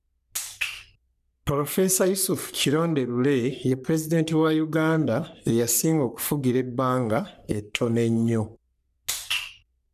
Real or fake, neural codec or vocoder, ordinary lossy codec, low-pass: fake; codec, 44.1 kHz, 3.4 kbps, Pupu-Codec; none; 14.4 kHz